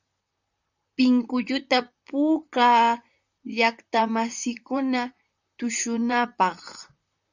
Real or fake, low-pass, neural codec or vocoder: fake; 7.2 kHz; vocoder, 44.1 kHz, 128 mel bands, Pupu-Vocoder